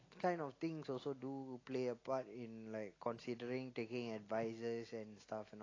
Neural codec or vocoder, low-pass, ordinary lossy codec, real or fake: none; 7.2 kHz; AAC, 32 kbps; real